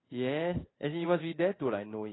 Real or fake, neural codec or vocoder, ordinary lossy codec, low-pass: fake; codec, 16 kHz in and 24 kHz out, 1 kbps, XY-Tokenizer; AAC, 16 kbps; 7.2 kHz